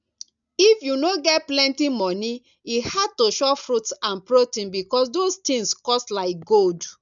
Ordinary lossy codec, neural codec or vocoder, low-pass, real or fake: none; none; 7.2 kHz; real